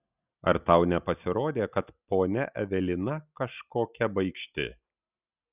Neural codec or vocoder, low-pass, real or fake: none; 3.6 kHz; real